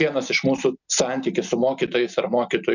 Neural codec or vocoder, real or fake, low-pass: none; real; 7.2 kHz